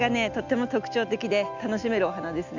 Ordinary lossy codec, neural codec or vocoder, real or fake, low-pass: none; none; real; 7.2 kHz